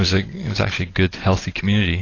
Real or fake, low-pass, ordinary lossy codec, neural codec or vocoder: real; 7.2 kHz; AAC, 32 kbps; none